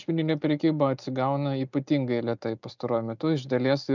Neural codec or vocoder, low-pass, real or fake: none; 7.2 kHz; real